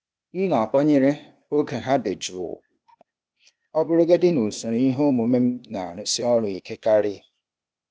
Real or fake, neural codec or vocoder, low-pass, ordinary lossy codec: fake; codec, 16 kHz, 0.8 kbps, ZipCodec; none; none